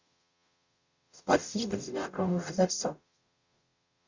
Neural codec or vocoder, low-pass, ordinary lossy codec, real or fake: codec, 44.1 kHz, 0.9 kbps, DAC; 7.2 kHz; Opus, 64 kbps; fake